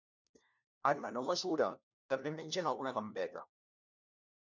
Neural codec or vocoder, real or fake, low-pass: codec, 16 kHz, 1 kbps, FunCodec, trained on LibriTTS, 50 frames a second; fake; 7.2 kHz